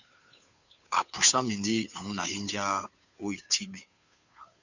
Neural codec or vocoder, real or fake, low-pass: codec, 16 kHz, 2 kbps, FunCodec, trained on Chinese and English, 25 frames a second; fake; 7.2 kHz